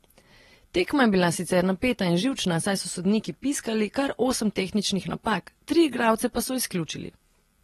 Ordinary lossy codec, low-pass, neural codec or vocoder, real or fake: AAC, 32 kbps; 19.8 kHz; none; real